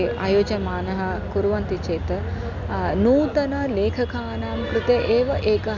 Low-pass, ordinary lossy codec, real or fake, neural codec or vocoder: 7.2 kHz; none; real; none